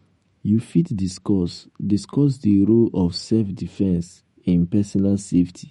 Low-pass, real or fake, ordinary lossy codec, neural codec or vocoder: 19.8 kHz; fake; MP3, 48 kbps; autoencoder, 48 kHz, 128 numbers a frame, DAC-VAE, trained on Japanese speech